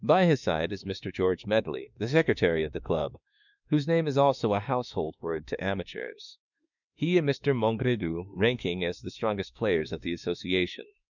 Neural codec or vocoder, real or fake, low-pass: autoencoder, 48 kHz, 32 numbers a frame, DAC-VAE, trained on Japanese speech; fake; 7.2 kHz